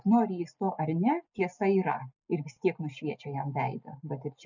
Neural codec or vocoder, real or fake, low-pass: none; real; 7.2 kHz